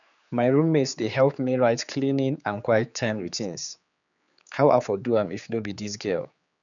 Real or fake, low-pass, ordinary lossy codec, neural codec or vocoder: fake; 7.2 kHz; none; codec, 16 kHz, 4 kbps, X-Codec, HuBERT features, trained on general audio